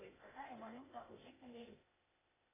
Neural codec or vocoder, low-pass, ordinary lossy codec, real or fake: codec, 16 kHz, 0.8 kbps, ZipCodec; 3.6 kHz; AAC, 16 kbps; fake